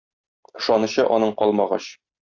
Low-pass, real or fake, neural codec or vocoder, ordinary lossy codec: 7.2 kHz; real; none; Opus, 64 kbps